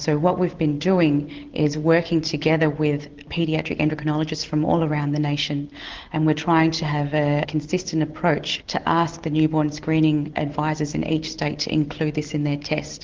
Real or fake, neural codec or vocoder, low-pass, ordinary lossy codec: real; none; 7.2 kHz; Opus, 16 kbps